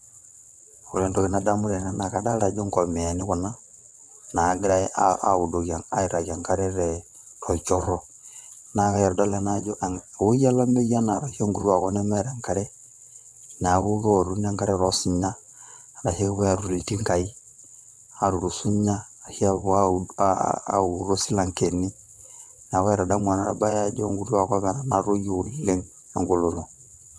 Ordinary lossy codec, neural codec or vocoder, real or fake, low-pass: none; vocoder, 22.05 kHz, 80 mel bands, WaveNeXt; fake; none